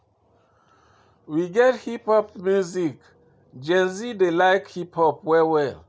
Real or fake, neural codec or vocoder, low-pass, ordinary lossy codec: real; none; none; none